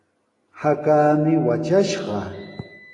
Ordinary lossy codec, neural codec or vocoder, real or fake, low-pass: AAC, 32 kbps; none; real; 10.8 kHz